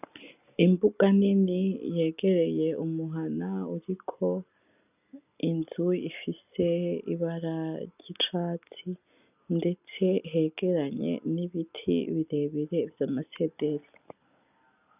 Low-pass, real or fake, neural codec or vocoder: 3.6 kHz; real; none